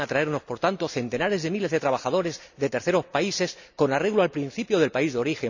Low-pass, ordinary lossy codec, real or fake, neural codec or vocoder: 7.2 kHz; none; real; none